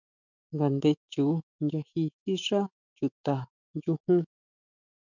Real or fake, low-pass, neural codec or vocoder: fake; 7.2 kHz; autoencoder, 48 kHz, 128 numbers a frame, DAC-VAE, trained on Japanese speech